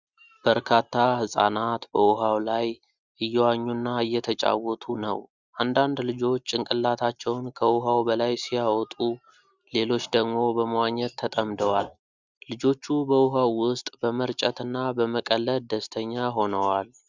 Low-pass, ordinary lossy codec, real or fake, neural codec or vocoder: 7.2 kHz; Opus, 64 kbps; real; none